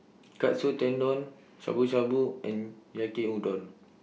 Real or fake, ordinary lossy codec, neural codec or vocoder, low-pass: real; none; none; none